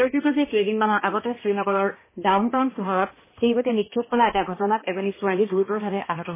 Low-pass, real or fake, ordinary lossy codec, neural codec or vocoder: 3.6 kHz; fake; MP3, 16 kbps; codec, 16 kHz, 1 kbps, X-Codec, HuBERT features, trained on balanced general audio